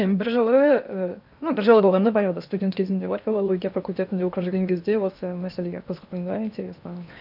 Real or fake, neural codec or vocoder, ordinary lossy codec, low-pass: fake; codec, 16 kHz in and 24 kHz out, 0.8 kbps, FocalCodec, streaming, 65536 codes; none; 5.4 kHz